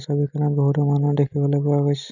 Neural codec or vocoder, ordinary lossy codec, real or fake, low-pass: none; none; real; 7.2 kHz